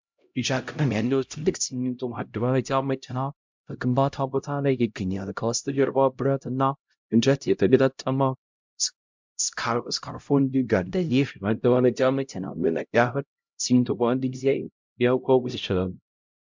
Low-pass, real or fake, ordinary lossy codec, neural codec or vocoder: 7.2 kHz; fake; MP3, 64 kbps; codec, 16 kHz, 0.5 kbps, X-Codec, HuBERT features, trained on LibriSpeech